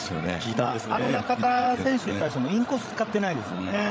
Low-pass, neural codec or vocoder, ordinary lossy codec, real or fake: none; codec, 16 kHz, 16 kbps, FreqCodec, larger model; none; fake